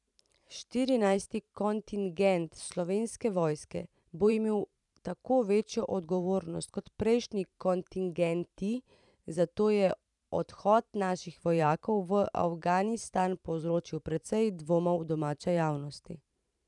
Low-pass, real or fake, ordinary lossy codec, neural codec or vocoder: 10.8 kHz; fake; none; vocoder, 44.1 kHz, 128 mel bands every 512 samples, BigVGAN v2